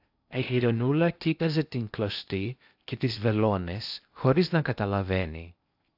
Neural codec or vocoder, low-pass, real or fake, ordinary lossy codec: codec, 16 kHz in and 24 kHz out, 0.6 kbps, FocalCodec, streaming, 2048 codes; 5.4 kHz; fake; AAC, 48 kbps